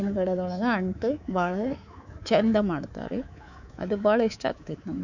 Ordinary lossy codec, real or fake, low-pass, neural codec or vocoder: none; fake; 7.2 kHz; codec, 24 kHz, 3.1 kbps, DualCodec